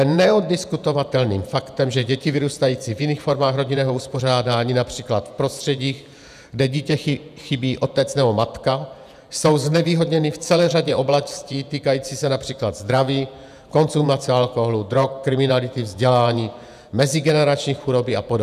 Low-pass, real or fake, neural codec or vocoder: 14.4 kHz; fake; vocoder, 48 kHz, 128 mel bands, Vocos